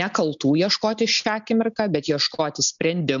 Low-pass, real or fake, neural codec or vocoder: 7.2 kHz; real; none